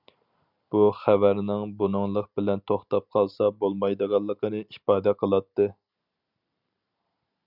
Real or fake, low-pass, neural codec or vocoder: real; 5.4 kHz; none